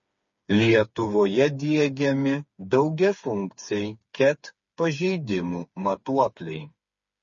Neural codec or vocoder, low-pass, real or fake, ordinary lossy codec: codec, 16 kHz, 4 kbps, FreqCodec, smaller model; 7.2 kHz; fake; MP3, 32 kbps